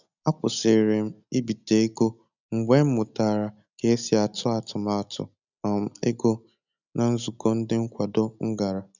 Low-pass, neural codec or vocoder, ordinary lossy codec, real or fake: 7.2 kHz; none; none; real